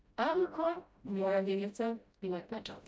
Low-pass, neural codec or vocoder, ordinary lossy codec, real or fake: none; codec, 16 kHz, 0.5 kbps, FreqCodec, smaller model; none; fake